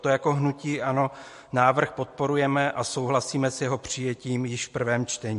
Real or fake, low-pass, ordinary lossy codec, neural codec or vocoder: real; 10.8 kHz; MP3, 48 kbps; none